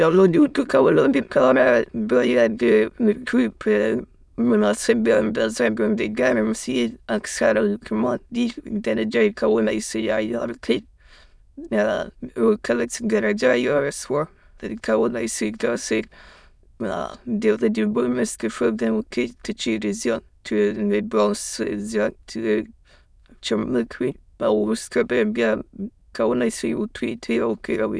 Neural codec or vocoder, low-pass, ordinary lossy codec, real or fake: autoencoder, 22.05 kHz, a latent of 192 numbers a frame, VITS, trained on many speakers; none; none; fake